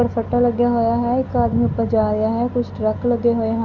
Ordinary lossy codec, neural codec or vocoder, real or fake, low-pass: none; none; real; 7.2 kHz